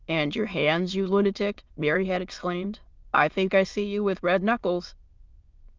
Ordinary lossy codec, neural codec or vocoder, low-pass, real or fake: Opus, 24 kbps; autoencoder, 22.05 kHz, a latent of 192 numbers a frame, VITS, trained on many speakers; 7.2 kHz; fake